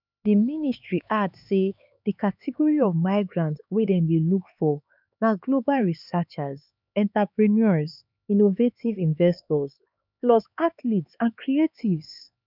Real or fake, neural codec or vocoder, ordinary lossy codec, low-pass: fake; codec, 16 kHz, 4 kbps, X-Codec, HuBERT features, trained on LibriSpeech; none; 5.4 kHz